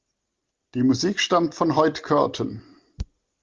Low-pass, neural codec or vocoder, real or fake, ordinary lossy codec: 7.2 kHz; none; real; Opus, 32 kbps